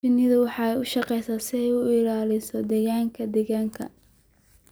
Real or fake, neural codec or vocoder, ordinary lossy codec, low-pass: fake; vocoder, 44.1 kHz, 128 mel bands every 256 samples, BigVGAN v2; none; none